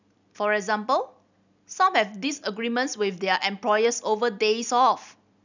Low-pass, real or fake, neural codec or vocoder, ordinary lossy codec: 7.2 kHz; real; none; none